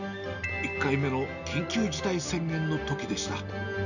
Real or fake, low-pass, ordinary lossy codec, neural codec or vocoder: real; 7.2 kHz; none; none